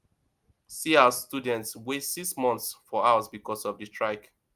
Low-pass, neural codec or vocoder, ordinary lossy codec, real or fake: 14.4 kHz; none; Opus, 32 kbps; real